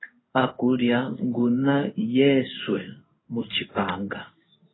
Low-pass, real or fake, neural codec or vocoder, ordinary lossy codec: 7.2 kHz; fake; codec, 16 kHz in and 24 kHz out, 1 kbps, XY-Tokenizer; AAC, 16 kbps